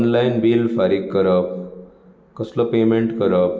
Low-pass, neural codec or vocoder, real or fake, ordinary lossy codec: none; none; real; none